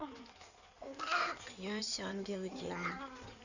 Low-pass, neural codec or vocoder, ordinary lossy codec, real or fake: 7.2 kHz; codec, 16 kHz in and 24 kHz out, 2.2 kbps, FireRedTTS-2 codec; none; fake